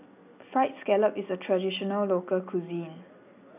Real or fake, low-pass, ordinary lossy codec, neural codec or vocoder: real; 3.6 kHz; none; none